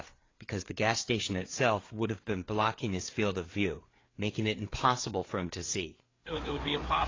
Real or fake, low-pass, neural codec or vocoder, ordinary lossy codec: fake; 7.2 kHz; codec, 16 kHz, 4 kbps, FreqCodec, larger model; AAC, 32 kbps